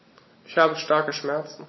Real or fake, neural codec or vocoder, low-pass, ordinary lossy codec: real; none; 7.2 kHz; MP3, 24 kbps